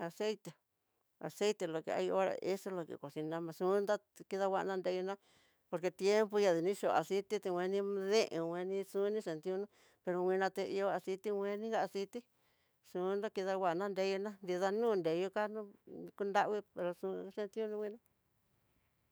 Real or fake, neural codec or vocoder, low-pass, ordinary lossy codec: fake; autoencoder, 48 kHz, 128 numbers a frame, DAC-VAE, trained on Japanese speech; none; none